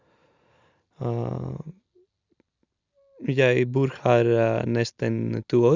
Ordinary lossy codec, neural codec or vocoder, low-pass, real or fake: Opus, 64 kbps; none; 7.2 kHz; real